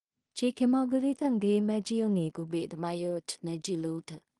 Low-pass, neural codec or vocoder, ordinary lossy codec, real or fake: 10.8 kHz; codec, 16 kHz in and 24 kHz out, 0.4 kbps, LongCat-Audio-Codec, two codebook decoder; Opus, 24 kbps; fake